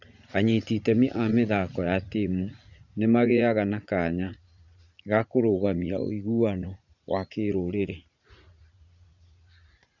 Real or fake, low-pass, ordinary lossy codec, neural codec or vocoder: fake; 7.2 kHz; none; vocoder, 44.1 kHz, 80 mel bands, Vocos